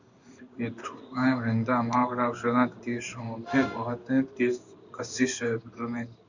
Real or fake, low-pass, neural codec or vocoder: fake; 7.2 kHz; codec, 16 kHz in and 24 kHz out, 1 kbps, XY-Tokenizer